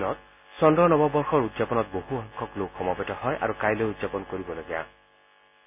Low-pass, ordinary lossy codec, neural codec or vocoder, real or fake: 3.6 kHz; none; none; real